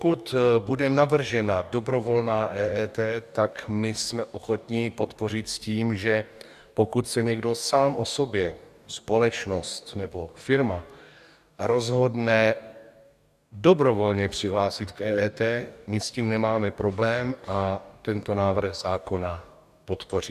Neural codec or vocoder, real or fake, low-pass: codec, 44.1 kHz, 2.6 kbps, DAC; fake; 14.4 kHz